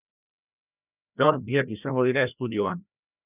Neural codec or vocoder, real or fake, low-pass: codec, 16 kHz, 1 kbps, FreqCodec, larger model; fake; 3.6 kHz